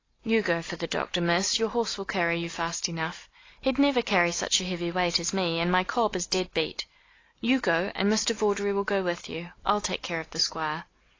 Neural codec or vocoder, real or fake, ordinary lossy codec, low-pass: none; real; AAC, 32 kbps; 7.2 kHz